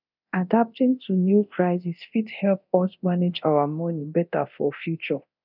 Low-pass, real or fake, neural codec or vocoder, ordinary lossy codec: 5.4 kHz; fake; codec, 24 kHz, 0.9 kbps, DualCodec; none